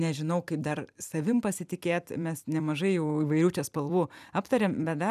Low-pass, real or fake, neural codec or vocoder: 14.4 kHz; fake; vocoder, 44.1 kHz, 128 mel bands every 256 samples, BigVGAN v2